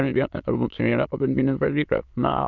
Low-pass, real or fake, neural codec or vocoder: 7.2 kHz; fake; autoencoder, 22.05 kHz, a latent of 192 numbers a frame, VITS, trained on many speakers